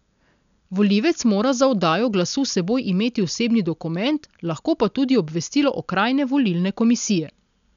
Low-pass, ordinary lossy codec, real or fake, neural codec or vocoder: 7.2 kHz; none; real; none